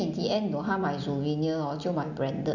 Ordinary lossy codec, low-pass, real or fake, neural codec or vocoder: none; 7.2 kHz; real; none